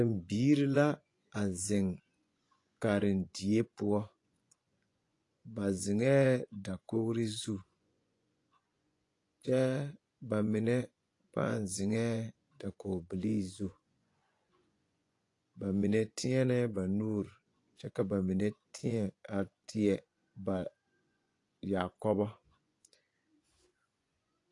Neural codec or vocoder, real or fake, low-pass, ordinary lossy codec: vocoder, 24 kHz, 100 mel bands, Vocos; fake; 10.8 kHz; AAC, 48 kbps